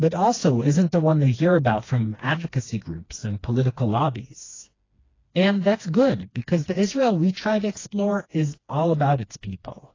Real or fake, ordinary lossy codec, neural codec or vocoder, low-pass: fake; AAC, 32 kbps; codec, 16 kHz, 2 kbps, FreqCodec, smaller model; 7.2 kHz